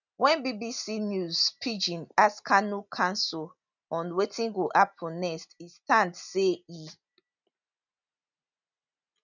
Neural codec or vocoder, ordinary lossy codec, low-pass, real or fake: none; none; 7.2 kHz; real